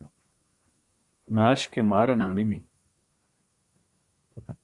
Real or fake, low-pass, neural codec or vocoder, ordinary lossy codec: fake; 10.8 kHz; codec, 24 kHz, 1 kbps, SNAC; Opus, 64 kbps